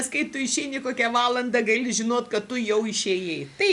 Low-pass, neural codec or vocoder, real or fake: 10.8 kHz; none; real